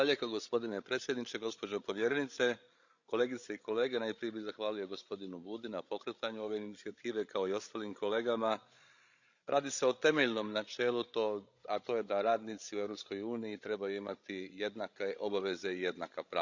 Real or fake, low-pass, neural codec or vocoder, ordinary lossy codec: fake; 7.2 kHz; codec, 16 kHz, 16 kbps, FreqCodec, larger model; Opus, 64 kbps